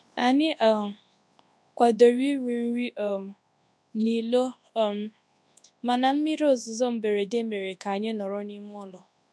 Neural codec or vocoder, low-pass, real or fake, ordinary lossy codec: codec, 24 kHz, 0.9 kbps, WavTokenizer, large speech release; none; fake; none